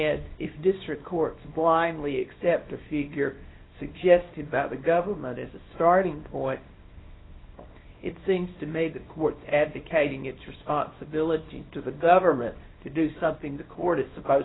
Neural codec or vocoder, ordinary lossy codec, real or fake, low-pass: codec, 24 kHz, 0.9 kbps, WavTokenizer, small release; AAC, 16 kbps; fake; 7.2 kHz